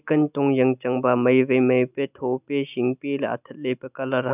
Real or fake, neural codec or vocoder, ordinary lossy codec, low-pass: real; none; none; 3.6 kHz